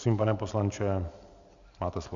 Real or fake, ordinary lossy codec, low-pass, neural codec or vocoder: real; Opus, 64 kbps; 7.2 kHz; none